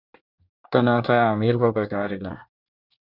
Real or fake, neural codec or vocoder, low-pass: fake; codec, 24 kHz, 1 kbps, SNAC; 5.4 kHz